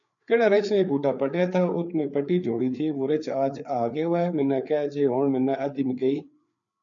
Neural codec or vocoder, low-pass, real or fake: codec, 16 kHz, 4 kbps, FreqCodec, larger model; 7.2 kHz; fake